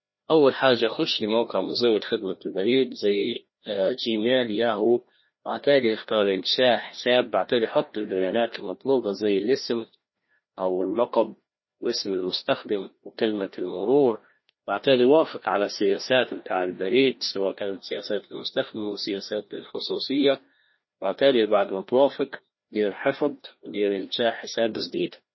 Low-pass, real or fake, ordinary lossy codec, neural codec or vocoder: 7.2 kHz; fake; MP3, 24 kbps; codec, 16 kHz, 1 kbps, FreqCodec, larger model